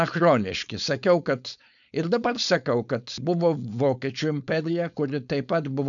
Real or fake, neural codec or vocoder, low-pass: fake; codec, 16 kHz, 4.8 kbps, FACodec; 7.2 kHz